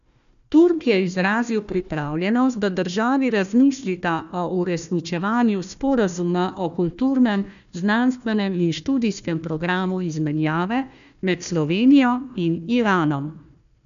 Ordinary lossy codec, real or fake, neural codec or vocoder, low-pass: none; fake; codec, 16 kHz, 1 kbps, FunCodec, trained on Chinese and English, 50 frames a second; 7.2 kHz